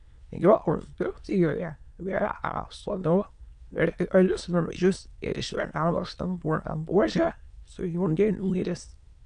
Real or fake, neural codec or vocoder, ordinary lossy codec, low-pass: fake; autoencoder, 22.05 kHz, a latent of 192 numbers a frame, VITS, trained on many speakers; AAC, 96 kbps; 9.9 kHz